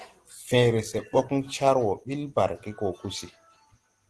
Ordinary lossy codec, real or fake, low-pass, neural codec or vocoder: Opus, 16 kbps; real; 10.8 kHz; none